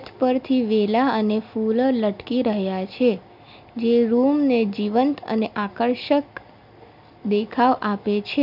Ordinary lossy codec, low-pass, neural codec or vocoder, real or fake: none; 5.4 kHz; none; real